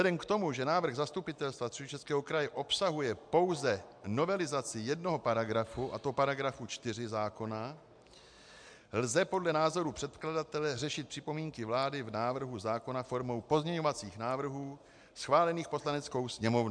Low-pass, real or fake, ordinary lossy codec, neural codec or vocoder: 9.9 kHz; real; MP3, 64 kbps; none